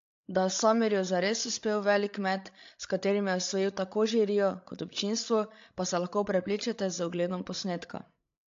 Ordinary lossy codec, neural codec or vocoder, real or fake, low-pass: AAC, 48 kbps; codec, 16 kHz, 16 kbps, FreqCodec, larger model; fake; 7.2 kHz